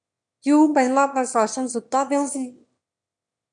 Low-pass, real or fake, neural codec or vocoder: 9.9 kHz; fake; autoencoder, 22.05 kHz, a latent of 192 numbers a frame, VITS, trained on one speaker